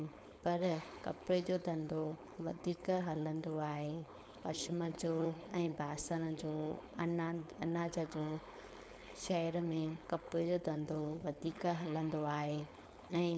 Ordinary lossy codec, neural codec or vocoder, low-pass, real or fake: none; codec, 16 kHz, 4.8 kbps, FACodec; none; fake